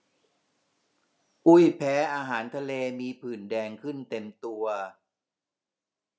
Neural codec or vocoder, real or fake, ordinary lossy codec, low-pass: none; real; none; none